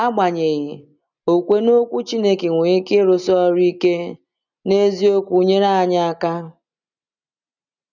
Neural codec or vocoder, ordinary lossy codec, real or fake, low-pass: none; none; real; 7.2 kHz